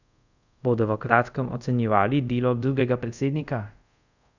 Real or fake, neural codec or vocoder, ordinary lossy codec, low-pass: fake; codec, 24 kHz, 0.5 kbps, DualCodec; none; 7.2 kHz